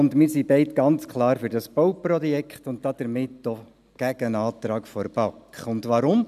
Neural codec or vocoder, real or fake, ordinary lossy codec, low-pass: none; real; none; 14.4 kHz